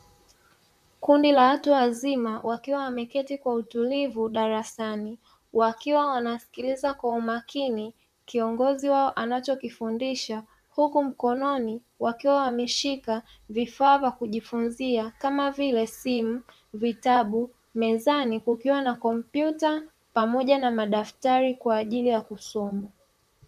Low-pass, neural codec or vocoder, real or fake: 14.4 kHz; vocoder, 44.1 kHz, 128 mel bands, Pupu-Vocoder; fake